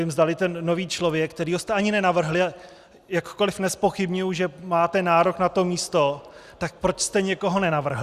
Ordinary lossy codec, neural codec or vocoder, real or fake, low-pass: Opus, 64 kbps; none; real; 14.4 kHz